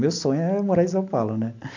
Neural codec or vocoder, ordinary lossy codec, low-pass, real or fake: none; none; 7.2 kHz; real